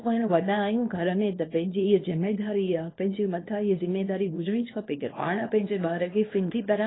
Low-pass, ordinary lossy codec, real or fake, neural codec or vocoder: 7.2 kHz; AAC, 16 kbps; fake; codec, 24 kHz, 0.9 kbps, WavTokenizer, small release